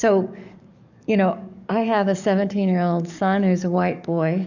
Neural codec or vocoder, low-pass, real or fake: codec, 44.1 kHz, 7.8 kbps, DAC; 7.2 kHz; fake